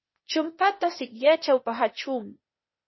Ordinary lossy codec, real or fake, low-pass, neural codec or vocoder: MP3, 24 kbps; fake; 7.2 kHz; codec, 16 kHz, 0.8 kbps, ZipCodec